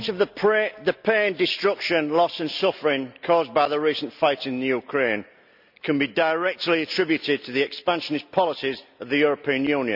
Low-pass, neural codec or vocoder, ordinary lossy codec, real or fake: 5.4 kHz; none; none; real